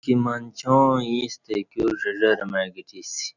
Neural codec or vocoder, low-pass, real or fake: none; 7.2 kHz; real